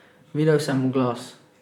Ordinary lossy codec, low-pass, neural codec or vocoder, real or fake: none; 19.8 kHz; vocoder, 44.1 kHz, 128 mel bands, Pupu-Vocoder; fake